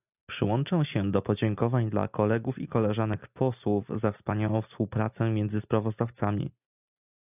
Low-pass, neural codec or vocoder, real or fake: 3.6 kHz; none; real